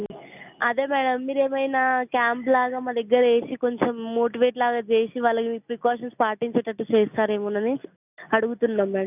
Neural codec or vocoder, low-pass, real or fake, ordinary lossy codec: none; 3.6 kHz; real; none